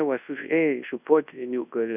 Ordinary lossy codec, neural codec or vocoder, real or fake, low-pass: AAC, 32 kbps; codec, 24 kHz, 0.9 kbps, WavTokenizer, large speech release; fake; 3.6 kHz